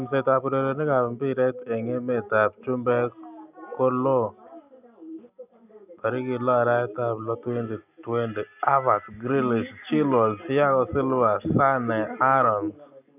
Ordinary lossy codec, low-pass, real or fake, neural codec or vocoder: none; 3.6 kHz; real; none